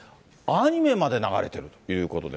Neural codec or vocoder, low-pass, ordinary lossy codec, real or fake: none; none; none; real